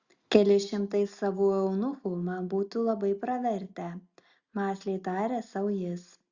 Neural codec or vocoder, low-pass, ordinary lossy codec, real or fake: none; 7.2 kHz; Opus, 64 kbps; real